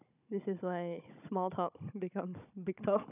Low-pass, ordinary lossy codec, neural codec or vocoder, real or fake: 3.6 kHz; none; codec, 16 kHz, 16 kbps, FunCodec, trained on Chinese and English, 50 frames a second; fake